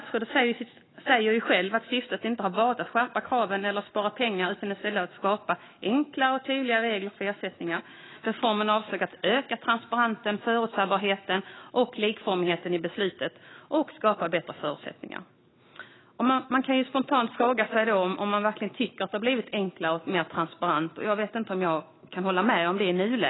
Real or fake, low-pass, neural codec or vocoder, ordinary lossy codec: fake; 7.2 kHz; autoencoder, 48 kHz, 128 numbers a frame, DAC-VAE, trained on Japanese speech; AAC, 16 kbps